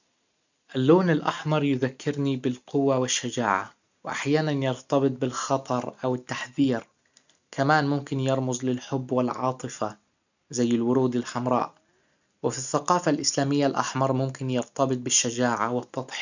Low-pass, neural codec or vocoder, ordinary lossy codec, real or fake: 7.2 kHz; none; none; real